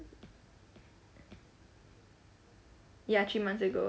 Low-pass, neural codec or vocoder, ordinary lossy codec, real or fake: none; none; none; real